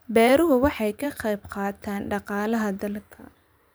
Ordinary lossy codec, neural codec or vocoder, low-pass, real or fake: none; vocoder, 44.1 kHz, 128 mel bands every 512 samples, BigVGAN v2; none; fake